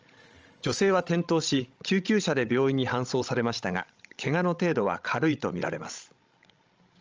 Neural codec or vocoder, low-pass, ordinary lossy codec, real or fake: codec, 16 kHz, 16 kbps, FreqCodec, larger model; 7.2 kHz; Opus, 24 kbps; fake